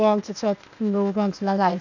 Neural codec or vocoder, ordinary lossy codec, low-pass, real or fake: codec, 16 kHz, 0.8 kbps, ZipCodec; none; 7.2 kHz; fake